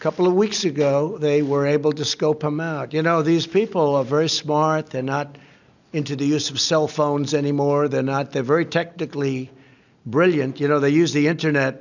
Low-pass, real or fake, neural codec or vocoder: 7.2 kHz; real; none